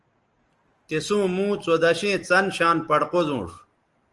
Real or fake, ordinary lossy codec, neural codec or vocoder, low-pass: real; Opus, 24 kbps; none; 10.8 kHz